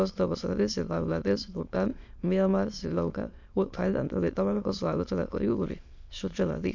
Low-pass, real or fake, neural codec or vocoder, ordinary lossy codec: 7.2 kHz; fake; autoencoder, 22.05 kHz, a latent of 192 numbers a frame, VITS, trained on many speakers; MP3, 64 kbps